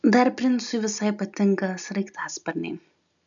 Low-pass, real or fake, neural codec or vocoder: 7.2 kHz; real; none